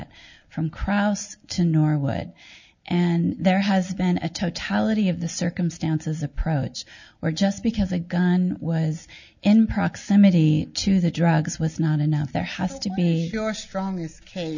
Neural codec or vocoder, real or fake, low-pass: none; real; 7.2 kHz